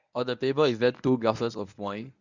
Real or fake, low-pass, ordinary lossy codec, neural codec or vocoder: fake; 7.2 kHz; none; codec, 24 kHz, 0.9 kbps, WavTokenizer, medium speech release version 1